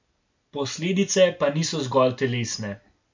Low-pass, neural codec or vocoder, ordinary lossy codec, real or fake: 7.2 kHz; none; MP3, 64 kbps; real